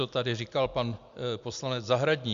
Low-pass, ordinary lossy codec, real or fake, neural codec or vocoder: 7.2 kHz; Opus, 64 kbps; real; none